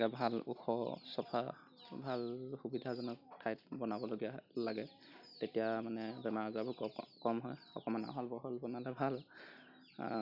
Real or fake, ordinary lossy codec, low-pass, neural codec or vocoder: real; none; 5.4 kHz; none